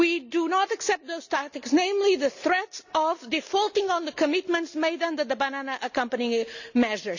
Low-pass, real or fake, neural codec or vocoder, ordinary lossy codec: 7.2 kHz; real; none; none